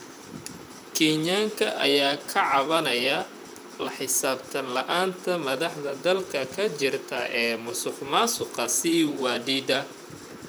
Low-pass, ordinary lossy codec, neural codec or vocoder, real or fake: none; none; vocoder, 44.1 kHz, 128 mel bands, Pupu-Vocoder; fake